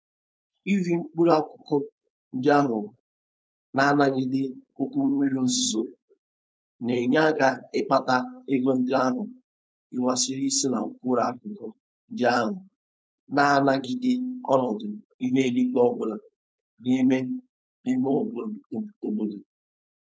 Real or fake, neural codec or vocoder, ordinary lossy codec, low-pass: fake; codec, 16 kHz, 4.8 kbps, FACodec; none; none